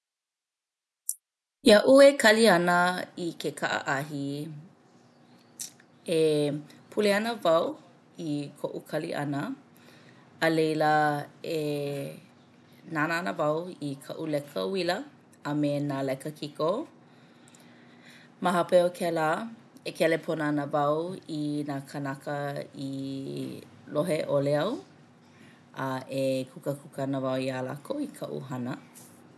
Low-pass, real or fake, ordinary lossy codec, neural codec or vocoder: none; real; none; none